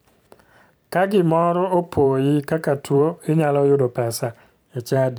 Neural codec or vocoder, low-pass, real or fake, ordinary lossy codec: vocoder, 44.1 kHz, 128 mel bands every 512 samples, BigVGAN v2; none; fake; none